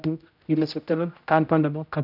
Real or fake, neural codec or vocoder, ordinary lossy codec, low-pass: fake; codec, 16 kHz, 0.5 kbps, X-Codec, HuBERT features, trained on general audio; none; 5.4 kHz